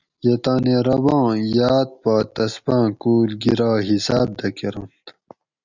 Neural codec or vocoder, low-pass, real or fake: none; 7.2 kHz; real